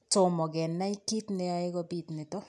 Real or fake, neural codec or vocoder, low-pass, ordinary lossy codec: real; none; none; none